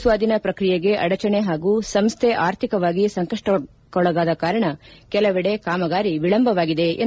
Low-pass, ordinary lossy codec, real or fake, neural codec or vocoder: none; none; real; none